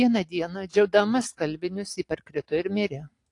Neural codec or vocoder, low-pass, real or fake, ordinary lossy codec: none; 10.8 kHz; real; AAC, 48 kbps